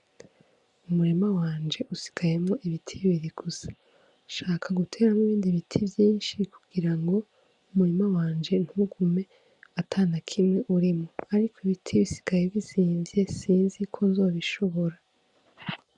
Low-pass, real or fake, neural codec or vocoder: 10.8 kHz; real; none